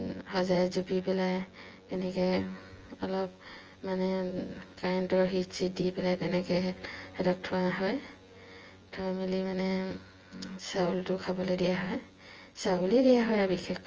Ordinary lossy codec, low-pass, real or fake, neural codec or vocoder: Opus, 24 kbps; 7.2 kHz; fake; vocoder, 24 kHz, 100 mel bands, Vocos